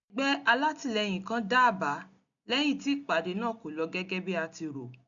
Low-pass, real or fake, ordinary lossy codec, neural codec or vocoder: 7.2 kHz; real; none; none